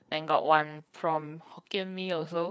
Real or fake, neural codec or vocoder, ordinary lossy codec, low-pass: fake; codec, 16 kHz, 4 kbps, FreqCodec, larger model; none; none